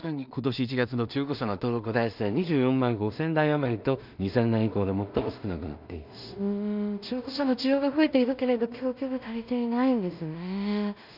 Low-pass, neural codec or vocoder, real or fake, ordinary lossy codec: 5.4 kHz; codec, 16 kHz in and 24 kHz out, 0.4 kbps, LongCat-Audio-Codec, two codebook decoder; fake; none